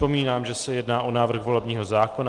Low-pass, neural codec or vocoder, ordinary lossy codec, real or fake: 10.8 kHz; none; Opus, 24 kbps; real